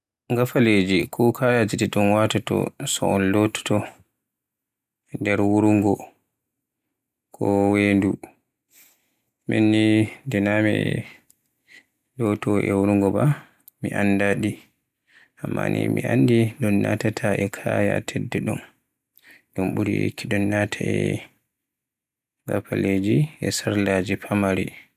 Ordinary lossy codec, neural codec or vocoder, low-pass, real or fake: none; none; 14.4 kHz; real